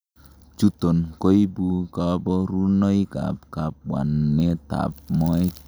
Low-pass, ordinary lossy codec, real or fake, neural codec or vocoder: none; none; real; none